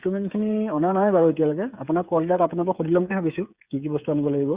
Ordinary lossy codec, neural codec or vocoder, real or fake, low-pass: Opus, 64 kbps; codec, 16 kHz, 8 kbps, FreqCodec, smaller model; fake; 3.6 kHz